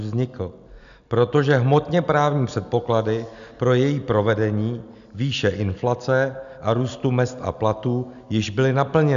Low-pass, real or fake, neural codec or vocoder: 7.2 kHz; real; none